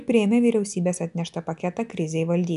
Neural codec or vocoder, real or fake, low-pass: none; real; 10.8 kHz